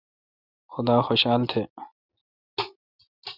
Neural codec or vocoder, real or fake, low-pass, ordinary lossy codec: none; real; 5.4 kHz; Opus, 64 kbps